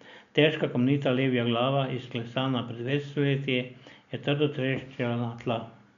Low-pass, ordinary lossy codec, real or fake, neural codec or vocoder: 7.2 kHz; none; real; none